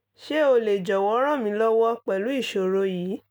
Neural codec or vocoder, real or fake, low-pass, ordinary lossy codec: none; real; none; none